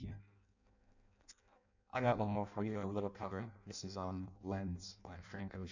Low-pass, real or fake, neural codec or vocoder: 7.2 kHz; fake; codec, 16 kHz in and 24 kHz out, 0.6 kbps, FireRedTTS-2 codec